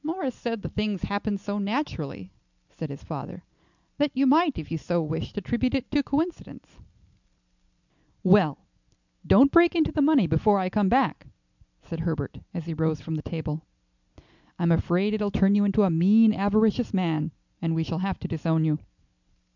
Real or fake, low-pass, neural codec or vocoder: real; 7.2 kHz; none